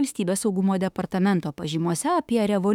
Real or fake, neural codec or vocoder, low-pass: fake; autoencoder, 48 kHz, 32 numbers a frame, DAC-VAE, trained on Japanese speech; 19.8 kHz